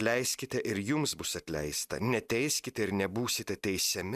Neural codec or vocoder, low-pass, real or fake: none; 14.4 kHz; real